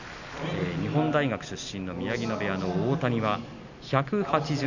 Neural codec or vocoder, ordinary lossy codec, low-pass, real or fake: none; none; 7.2 kHz; real